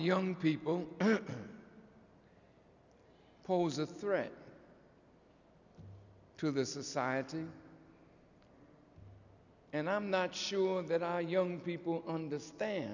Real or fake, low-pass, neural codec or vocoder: real; 7.2 kHz; none